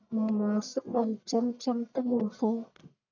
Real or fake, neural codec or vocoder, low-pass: fake; codec, 44.1 kHz, 1.7 kbps, Pupu-Codec; 7.2 kHz